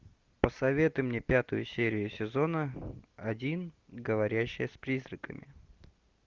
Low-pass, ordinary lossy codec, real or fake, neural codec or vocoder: 7.2 kHz; Opus, 32 kbps; real; none